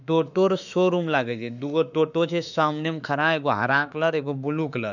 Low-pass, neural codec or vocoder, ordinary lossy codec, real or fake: 7.2 kHz; autoencoder, 48 kHz, 32 numbers a frame, DAC-VAE, trained on Japanese speech; none; fake